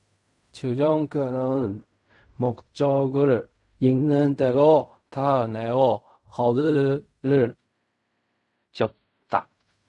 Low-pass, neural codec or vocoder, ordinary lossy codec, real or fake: 10.8 kHz; codec, 16 kHz in and 24 kHz out, 0.4 kbps, LongCat-Audio-Codec, fine tuned four codebook decoder; AAC, 64 kbps; fake